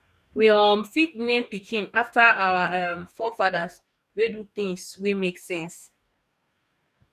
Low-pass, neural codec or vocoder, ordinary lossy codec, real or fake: 14.4 kHz; codec, 44.1 kHz, 2.6 kbps, DAC; none; fake